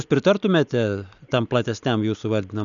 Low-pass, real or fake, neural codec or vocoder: 7.2 kHz; real; none